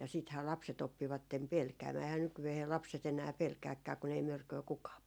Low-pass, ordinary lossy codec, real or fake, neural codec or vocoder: none; none; real; none